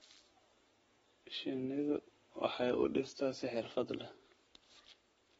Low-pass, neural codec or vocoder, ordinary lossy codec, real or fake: 19.8 kHz; codec, 44.1 kHz, 7.8 kbps, Pupu-Codec; AAC, 24 kbps; fake